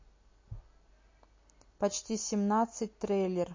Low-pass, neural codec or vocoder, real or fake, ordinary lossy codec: 7.2 kHz; none; real; MP3, 32 kbps